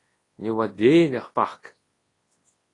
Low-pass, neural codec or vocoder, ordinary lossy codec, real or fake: 10.8 kHz; codec, 24 kHz, 0.9 kbps, WavTokenizer, large speech release; AAC, 32 kbps; fake